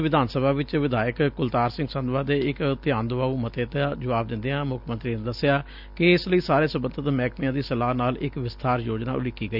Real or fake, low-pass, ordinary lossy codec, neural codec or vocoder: real; 5.4 kHz; none; none